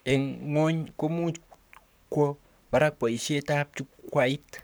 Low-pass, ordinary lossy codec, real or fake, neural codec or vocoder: none; none; fake; codec, 44.1 kHz, 7.8 kbps, Pupu-Codec